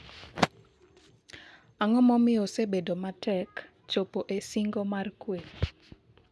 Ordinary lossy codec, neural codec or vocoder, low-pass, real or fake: none; none; none; real